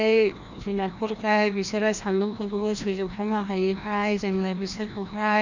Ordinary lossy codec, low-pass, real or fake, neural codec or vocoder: none; 7.2 kHz; fake; codec, 16 kHz, 1 kbps, FreqCodec, larger model